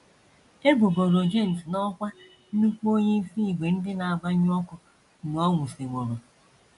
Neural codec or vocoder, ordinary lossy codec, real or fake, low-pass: none; AAC, 64 kbps; real; 10.8 kHz